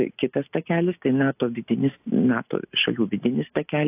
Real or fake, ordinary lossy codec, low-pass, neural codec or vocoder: real; AAC, 32 kbps; 3.6 kHz; none